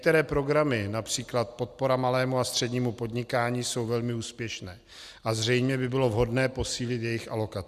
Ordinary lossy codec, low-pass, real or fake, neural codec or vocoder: Opus, 64 kbps; 14.4 kHz; real; none